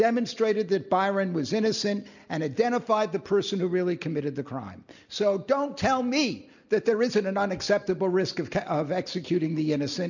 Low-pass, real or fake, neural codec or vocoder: 7.2 kHz; real; none